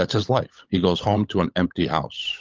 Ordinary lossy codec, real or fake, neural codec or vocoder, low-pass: Opus, 24 kbps; fake; codec, 16 kHz, 16 kbps, FunCodec, trained on LibriTTS, 50 frames a second; 7.2 kHz